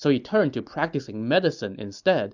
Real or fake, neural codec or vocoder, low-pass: real; none; 7.2 kHz